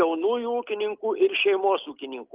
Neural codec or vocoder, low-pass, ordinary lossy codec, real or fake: none; 3.6 kHz; Opus, 32 kbps; real